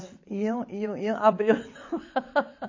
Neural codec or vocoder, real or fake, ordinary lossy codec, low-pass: vocoder, 22.05 kHz, 80 mel bands, WaveNeXt; fake; MP3, 32 kbps; 7.2 kHz